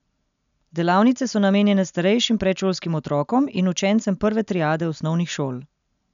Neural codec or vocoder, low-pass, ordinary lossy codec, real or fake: none; 7.2 kHz; none; real